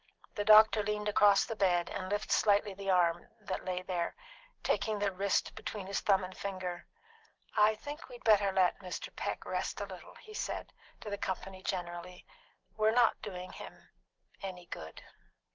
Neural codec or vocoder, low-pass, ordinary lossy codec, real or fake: none; 7.2 kHz; Opus, 16 kbps; real